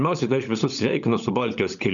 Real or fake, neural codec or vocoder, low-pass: fake; codec, 16 kHz, 16 kbps, FunCodec, trained on LibriTTS, 50 frames a second; 7.2 kHz